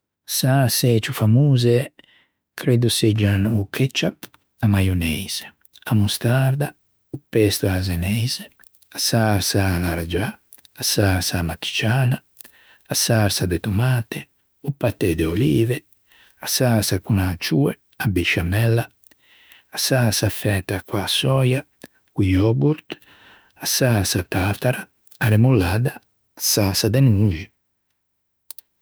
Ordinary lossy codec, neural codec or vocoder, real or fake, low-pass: none; autoencoder, 48 kHz, 32 numbers a frame, DAC-VAE, trained on Japanese speech; fake; none